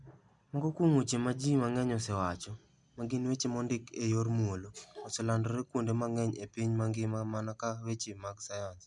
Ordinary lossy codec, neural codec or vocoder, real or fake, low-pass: none; none; real; 10.8 kHz